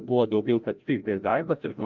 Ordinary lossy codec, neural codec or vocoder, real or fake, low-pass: Opus, 24 kbps; codec, 16 kHz, 0.5 kbps, FreqCodec, larger model; fake; 7.2 kHz